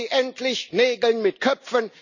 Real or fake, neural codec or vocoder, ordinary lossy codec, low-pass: real; none; none; 7.2 kHz